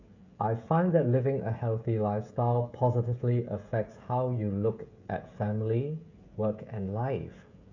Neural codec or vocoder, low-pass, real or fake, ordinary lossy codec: codec, 16 kHz, 16 kbps, FreqCodec, smaller model; 7.2 kHz; fake; none